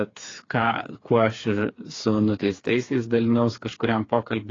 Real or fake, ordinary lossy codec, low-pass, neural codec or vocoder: fake; AAC, 32 kbps; 7.2 kHz; codec, 16 kHz, 4 kbps, FreqCodec, smaller model